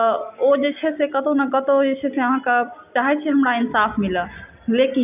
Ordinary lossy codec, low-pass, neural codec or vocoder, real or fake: none; 3.6 kHz; none; real